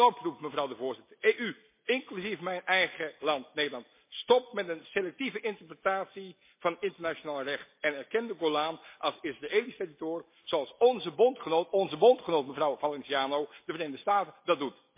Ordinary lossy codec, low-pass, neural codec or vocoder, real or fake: MP3, 24 kbps; 3.6 kHz; none; real